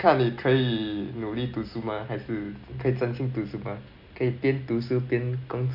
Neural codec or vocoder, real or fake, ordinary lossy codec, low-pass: none; real; none; 5.4 kHz